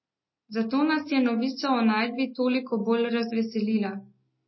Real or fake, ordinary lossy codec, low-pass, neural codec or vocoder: real; MP3, 24 kbps; 7.2 kHz; none